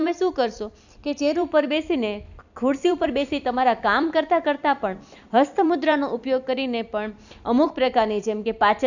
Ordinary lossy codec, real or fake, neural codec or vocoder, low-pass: none; fake; vocoder, 44.1 kHz, 128 mel bands every 256 samples, BigVGAN v2; 7.2 kHz